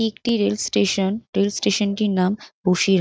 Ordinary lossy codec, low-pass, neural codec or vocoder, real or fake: none; none; none; real